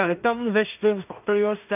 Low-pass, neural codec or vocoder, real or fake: 3.6 kHz; codec, 16 kHz in and 24 kHz out, 0.4 kbps, LongCat-Audio-Codec, two codebook decoder; fake